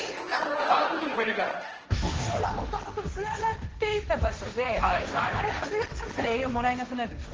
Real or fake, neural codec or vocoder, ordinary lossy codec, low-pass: fake; codec, 16 kHz, 1.1 kbps, Voila-Tokenizer; Opus, 16 kbps; 7.2 kHz